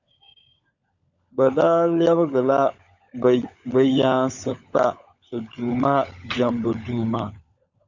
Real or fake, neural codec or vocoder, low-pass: fake; codec, 16 kHz, 16 kbps, FunCodec, trained on LibriTTS, 50 frames a second; 7.2 kHz